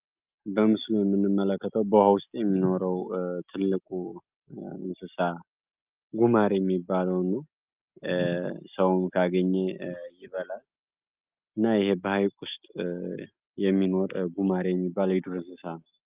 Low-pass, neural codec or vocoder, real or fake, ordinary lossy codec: 3.6 kHz; none; real; Opus, 24 kbps